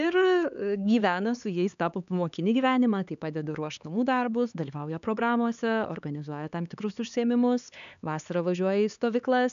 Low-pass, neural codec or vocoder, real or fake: 7.2 kHz; codec, 16 kHz, 4 kbps, X-Codec, HuBERT features, trained on LibriSpeech; fake